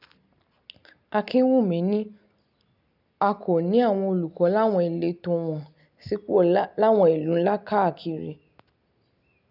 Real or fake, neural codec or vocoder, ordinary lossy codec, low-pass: real; none; none; 5.4 kHz